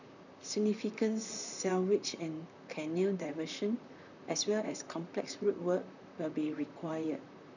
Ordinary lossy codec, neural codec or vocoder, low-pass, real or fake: none; vocoder, 44.1 kHz, 128 mel bands, Pupu-Vocoder; 7.2 kHz; fake